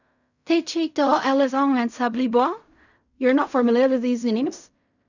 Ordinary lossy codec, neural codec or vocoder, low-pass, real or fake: none; codec, 16 kHz in and 24 kHz out, 0.4 kbps, LongCat-Audio-Codec, fine tuned four codebook decoder; 7.2 kHz; fake